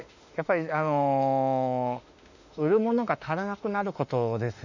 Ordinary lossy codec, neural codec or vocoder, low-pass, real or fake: none; autoencoder, 48 kHz, 32 numbers a frame, DAC-VAE, trained on Japanese speech; 7.2 kHz; fake